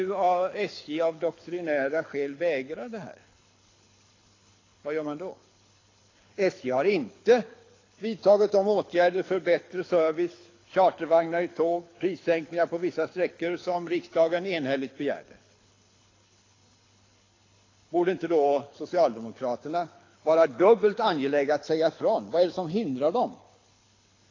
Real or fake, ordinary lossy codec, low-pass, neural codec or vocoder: fake; AAC, 32 kbps; 7.2 kHz; codec, 24 kHz, 6 kbps, HILCodec